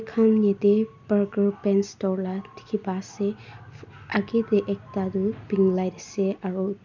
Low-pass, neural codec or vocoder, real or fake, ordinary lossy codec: 7.2 kHz; none; real; MP3, 64 kbps